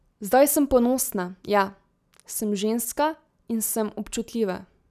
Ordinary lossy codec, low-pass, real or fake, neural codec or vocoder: none; 14.4 kHz; real; none